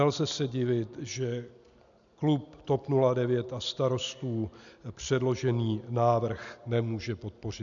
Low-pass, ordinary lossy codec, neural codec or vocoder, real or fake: 7.2 kHz; MP3, 96 kbps; none; real